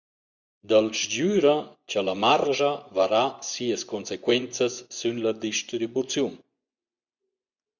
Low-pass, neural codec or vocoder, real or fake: 7.2 kHz; vocoder, 24 kHz, 100 mel bands, Vocos; fake